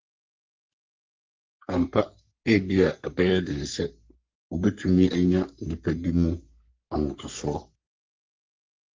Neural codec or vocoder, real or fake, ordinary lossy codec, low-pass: codec, 44.1 kHz, 3.4 kbps, Pupu-Codec; fake; Opus, 32 kbps; 7.2 kHz